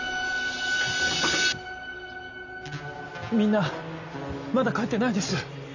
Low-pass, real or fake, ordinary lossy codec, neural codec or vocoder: 7.2 kHz; real; none; none